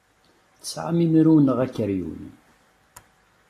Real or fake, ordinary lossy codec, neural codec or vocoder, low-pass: real; AAC, 48 kbps; none; 14.4 kHz